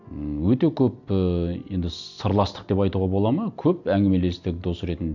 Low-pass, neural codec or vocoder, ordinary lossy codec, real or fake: 7.2 kHz; none; none; real